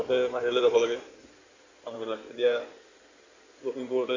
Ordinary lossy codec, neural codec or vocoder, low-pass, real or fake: AAC, 48 kbps; codec, 16 kHz in and 24 kHz out, 2.2 kbps, FireRedTTS-2 codec; 7.2 kHz; fake